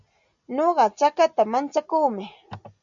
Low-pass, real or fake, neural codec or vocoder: 7.2 kHz; real; none